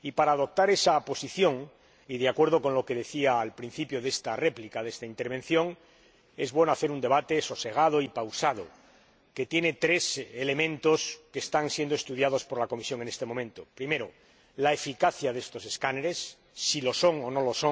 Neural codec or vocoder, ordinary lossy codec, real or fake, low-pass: none; none; real; none